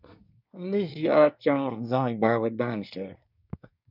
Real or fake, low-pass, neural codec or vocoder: fake; 5.4 kHz; codec, 24 kHz, 1 kbps, SNAC